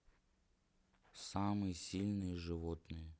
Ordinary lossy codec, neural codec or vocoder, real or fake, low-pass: none; none; real; none